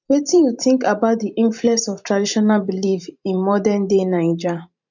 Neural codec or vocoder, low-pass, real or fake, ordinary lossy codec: vocoder, 44.1 kHz, 128 mel bands every 512 samples, BigVGAN v2; 7.2 kHz; fake; none